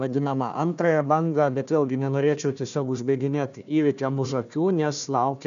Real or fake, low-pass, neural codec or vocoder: fake; 7.2 kHz; codec, 16 kHz, 1 kbps, FunCodec, trained on Chinese and English, 50 frames a second